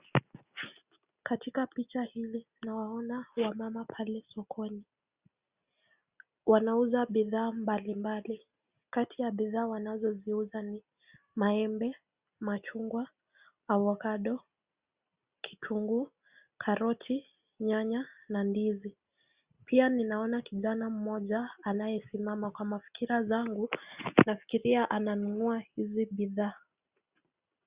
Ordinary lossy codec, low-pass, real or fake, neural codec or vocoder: Opus, 64 kbps; 3.6 kHz; real; none